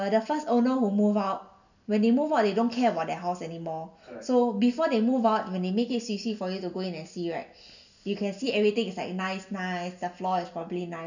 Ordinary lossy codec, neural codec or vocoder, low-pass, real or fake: none; none; 7.2 kHz; real